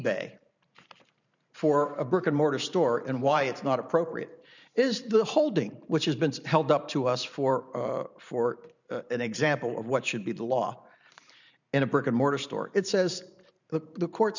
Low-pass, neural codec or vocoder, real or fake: 7.2 kHz; vocoder, 44.1 kHz, 128 mel bands every 512 samples, BigVGAN v2; fake